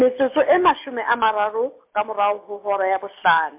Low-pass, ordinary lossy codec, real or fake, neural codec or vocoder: 3.6 kHz; MP3, 32 kbps; real; none